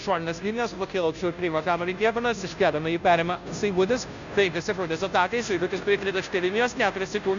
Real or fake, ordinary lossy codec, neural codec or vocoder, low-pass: fake; MP3, 96 kbps; codec, 16 kHz, 0.5 kbps, FunCodec, trained on Chinese and English, 25 frames a second; 7.2 kHz